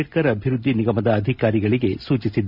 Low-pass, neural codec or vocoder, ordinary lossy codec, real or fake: 5.4 kHz; none; none; real